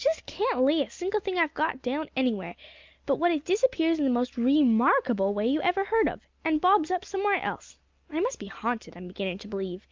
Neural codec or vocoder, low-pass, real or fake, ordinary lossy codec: none; 7.2 kHz; real; Opus, 24 kbps